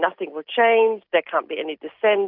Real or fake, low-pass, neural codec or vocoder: real; 5.4 kHz; none